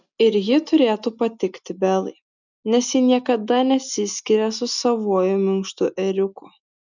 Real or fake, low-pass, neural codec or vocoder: real; 7.2 kHz; none